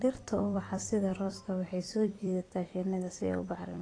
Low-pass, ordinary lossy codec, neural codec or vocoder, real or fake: 9.9 kHz; AAC, 32 kbps; vocoder, 44.1 kHz, 128 mel bands every 256 samples, BigVGAN v2; fake